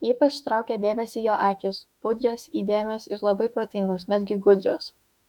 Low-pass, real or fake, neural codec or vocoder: 19.8 kHz; fake; autoencoder, 48 kHz, 32 numbers a frame, DAC-VAE, trained on Japanese speech